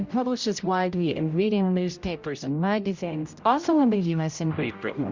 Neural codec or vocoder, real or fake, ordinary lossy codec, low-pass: codec, 16 kHz, 0.5 kbps, X-Codec, HuBERT features, trained on general audio; fake; Opus, 64 kbps; 7.2 kHz